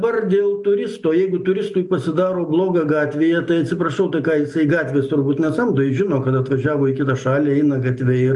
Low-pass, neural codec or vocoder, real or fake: 10.8 kHz; none; real